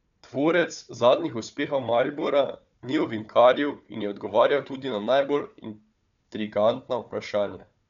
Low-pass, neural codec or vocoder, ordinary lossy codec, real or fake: 7.2 kHz; codec, 16 kHz, 4 kbps, FunCodec, trained on Chinese and English, 50 frames a second; none; fake